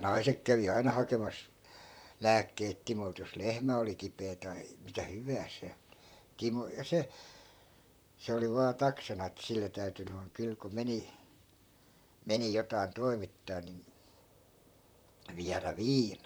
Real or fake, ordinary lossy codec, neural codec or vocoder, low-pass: fake; none; vocoder, 44.1 kHz, 128 mel bands, Pupu-Vocoder; none